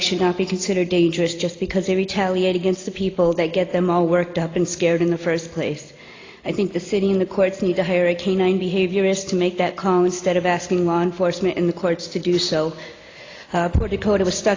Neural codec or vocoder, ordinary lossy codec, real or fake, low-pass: none; AAC, 32 kbps; real; 7.2 kHz